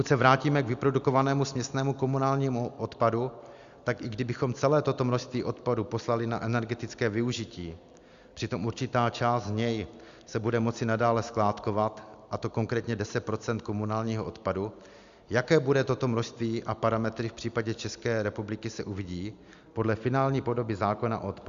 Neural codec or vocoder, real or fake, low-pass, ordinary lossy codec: none; real; 7.2 kHz; Opus, 64 kbps